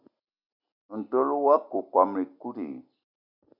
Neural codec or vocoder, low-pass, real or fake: none; 5.4 kHz; real